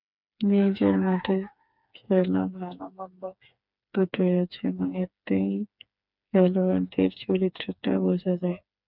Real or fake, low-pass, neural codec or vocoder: fake; 5.4 kHz; codec, 16 kHz, 4 kbps, FreqCodec, smaller model